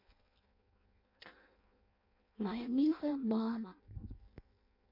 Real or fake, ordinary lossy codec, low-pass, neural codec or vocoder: fake; MP3, 32 kbps; 5.4 kHz; codec, 16 kHz in and 24 kHz out, 0.6 kbps, FireRedTTS-2 codec